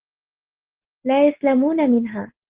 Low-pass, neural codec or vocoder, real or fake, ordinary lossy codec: 3.6 kHz; none; real; Opus, 16 kbps